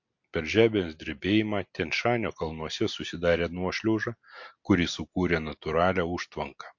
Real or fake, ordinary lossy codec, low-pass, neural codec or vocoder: real; MP3, 48 kbps; 7.2 kHz; none